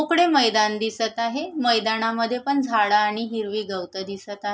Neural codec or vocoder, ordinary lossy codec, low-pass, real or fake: none; none; none; real